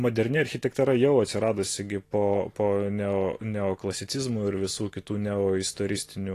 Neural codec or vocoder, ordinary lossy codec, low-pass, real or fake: none; AAC, 48 kbps; 14.4 kHz; real